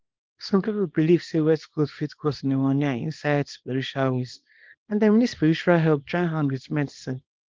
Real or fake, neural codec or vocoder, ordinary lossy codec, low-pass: fake; codec, 24 kHz, 0.9 kbps, WavTokenizer, small release; Opus, 16 kbps; 7.2 kHz